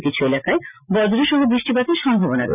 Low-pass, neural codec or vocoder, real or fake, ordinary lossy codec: 3.6 kHz; none; real; none